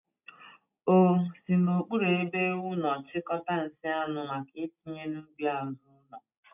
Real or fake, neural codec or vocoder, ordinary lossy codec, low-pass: real; none; none; 3.6 kHz